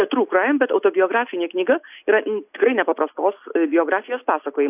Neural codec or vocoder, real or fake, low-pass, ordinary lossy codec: none; real; 3.6 kHz; AAC, 32 kbps